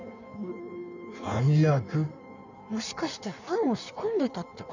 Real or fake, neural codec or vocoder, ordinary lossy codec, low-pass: fake; codec, 16 kHz in and 24 kHz out, 1.1 kbps, FireRedTTS-2 codec; none; 7.2 kHz